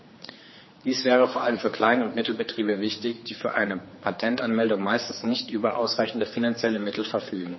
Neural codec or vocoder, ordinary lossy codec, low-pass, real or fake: codec, 16 kHz, 4 kbps, X-Codec, HuBERT features, trained on general audio; MP3, 24 kbps; 7.2 kHz; fake